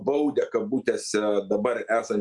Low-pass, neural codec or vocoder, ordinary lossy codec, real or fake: 10.8 kHz; none; Opus, 64 kbps; real